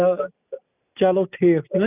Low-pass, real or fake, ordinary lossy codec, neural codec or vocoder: 3.6 kHz; real; none; none